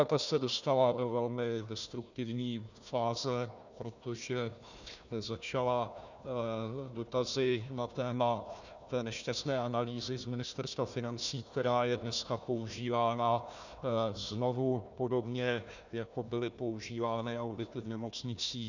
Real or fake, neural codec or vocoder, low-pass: fake; codec, 16 kHz, 1 kbps, FunCodec, trained on Chinese and English, 50 frames a second; 7.2 kHz